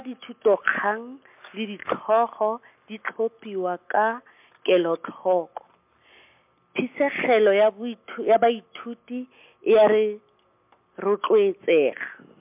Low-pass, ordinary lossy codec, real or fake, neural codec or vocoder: 3.6 kHz; MP3, 32 kbps; real; none